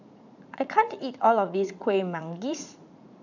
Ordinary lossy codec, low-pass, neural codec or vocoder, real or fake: none; 7.2 kHz; vocoder, 44.1 kHz, 80 mel bands, Vocos; fake